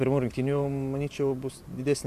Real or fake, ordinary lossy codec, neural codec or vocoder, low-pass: fake; MP3, 96 kbps; vocoder, 44.1 kHz, 128 mel bands every 512 samples, BigVGAN v2; 14.4 kHz